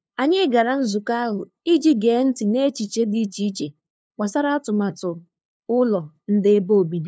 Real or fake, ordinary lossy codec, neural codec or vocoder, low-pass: fake; none; codec, 16 kHz, 2 kbps, FunCodec, trained on LibriTTS, 25 frames a second; none